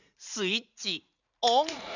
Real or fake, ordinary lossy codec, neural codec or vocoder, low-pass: fake; none; vocoder, 44.1 kHz, 80 mel bands, Vocos; 7.2 kHz